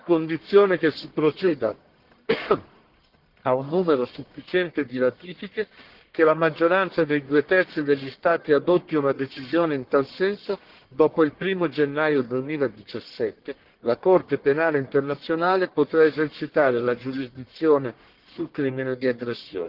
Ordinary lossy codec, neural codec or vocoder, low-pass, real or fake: Opus, 16 kbps; codec, 44.1 kHz, 1.7 kbps, Pupu-Codec; 5.4 kHz; fake